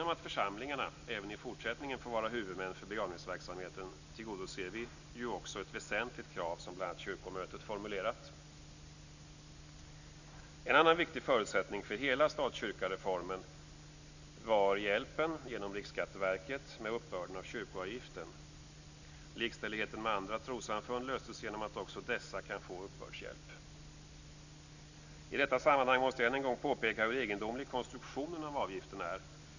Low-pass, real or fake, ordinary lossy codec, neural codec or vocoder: 7.2 kHz; real; none; none